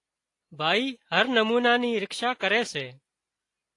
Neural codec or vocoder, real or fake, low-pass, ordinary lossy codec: none; real; 10.8 kHz; AAC, 48 kbps